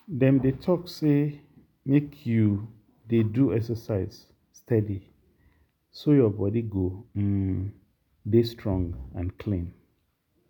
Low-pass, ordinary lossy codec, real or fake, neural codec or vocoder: 19.8 kHz; none; real; none